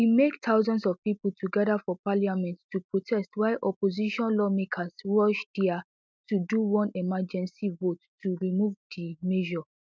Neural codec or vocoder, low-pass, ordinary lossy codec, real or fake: none; none; none; real